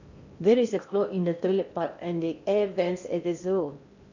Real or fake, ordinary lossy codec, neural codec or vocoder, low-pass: fake; none; codec, 16 kHz in and 24 kHz out, 0.8 kbps, FocalCodec, streaming, 65536 codes; 7.2 kHz